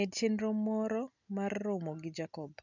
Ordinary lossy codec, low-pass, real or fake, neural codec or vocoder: none; 7.2 kHz; real; none